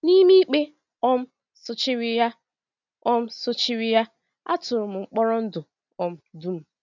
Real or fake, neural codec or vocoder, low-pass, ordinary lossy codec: real; none; 7.2 kHz; none